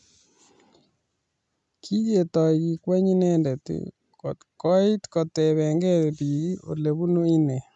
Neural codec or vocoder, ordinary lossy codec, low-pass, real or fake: none; none; 10.8 kHz; real